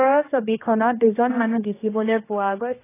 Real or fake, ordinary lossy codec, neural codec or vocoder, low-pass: fake; AAC, 16 kbps; codec, 16 kHz, 1 kbps, X-Codec, HuBERT features, trained on general audio; 3.6 kHz